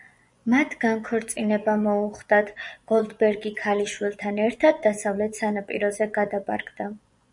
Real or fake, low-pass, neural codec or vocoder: fake; 10.8 kHz; vocoder, 24 kHz, 100 mel bands, Vocos